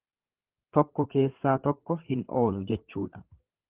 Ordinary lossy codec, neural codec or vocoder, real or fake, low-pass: Opus, 16 kbps; codec, 16 kHz, 8 kbps, FreqCodec, larger model; fake; 3.6 kHz